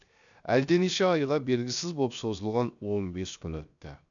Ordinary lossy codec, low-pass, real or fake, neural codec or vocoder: none; 7.2 kHz; fake; codec, 16 kHz, 0.7 kbps, FocalCodec